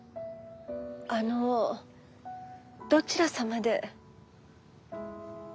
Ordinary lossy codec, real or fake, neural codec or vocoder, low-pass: none; real; none; none